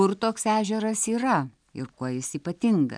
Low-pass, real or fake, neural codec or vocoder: 9.9 kHz; real; none